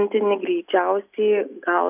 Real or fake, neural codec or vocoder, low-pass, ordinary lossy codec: real; none; 3.6 kHz; AAC, 32 kbps